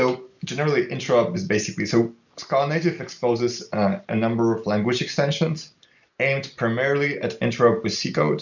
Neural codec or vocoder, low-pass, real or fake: none; 7.2 kHz; real